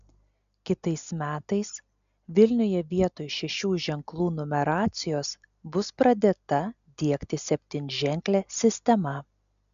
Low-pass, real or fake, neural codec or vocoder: 7.2 kHz; real; none